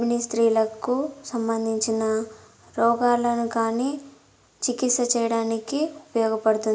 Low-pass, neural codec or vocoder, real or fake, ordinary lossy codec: none; none; real; none